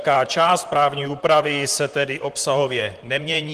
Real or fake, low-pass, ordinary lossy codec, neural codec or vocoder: fake; 14.4 kHz; Opus, 24 kbps; vocoder, 44.1 kHz, 128 mel bands, Pupu-Vocoder